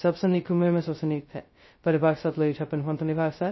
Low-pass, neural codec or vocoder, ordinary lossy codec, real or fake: 7.2 kHz; codec, 16 kHz, 0.2 kbps, FocalCodec; MP3, 24 kbps; fake